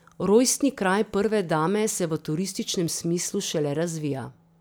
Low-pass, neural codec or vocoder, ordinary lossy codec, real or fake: none; none; none; real